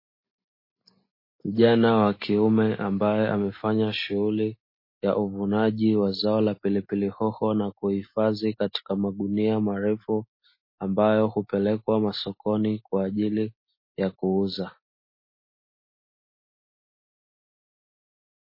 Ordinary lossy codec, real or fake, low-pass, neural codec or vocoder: MP3, 24 kbps; real; 5.4 kHz; none